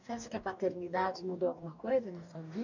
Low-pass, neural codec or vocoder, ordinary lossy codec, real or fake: 7.2 kHz; codec, 44.1 kHz, 2.6 kbps, DAC; none; fake